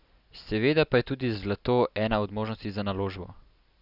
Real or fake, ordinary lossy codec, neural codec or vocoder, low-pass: real; none; none; 5.4 kHz